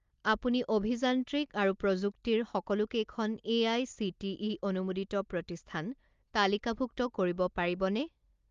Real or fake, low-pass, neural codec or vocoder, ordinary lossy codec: real; 7.2 kHz; none; Opus, 32 kbps